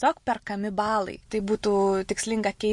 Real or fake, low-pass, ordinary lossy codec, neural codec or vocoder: real; 10.8 kHz; MP3, 48 kbps; none